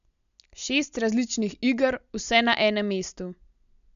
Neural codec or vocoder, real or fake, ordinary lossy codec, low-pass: none; real; none; 7.2 kHz